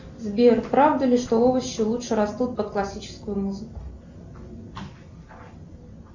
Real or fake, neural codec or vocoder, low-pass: real; none; 7.2 kHz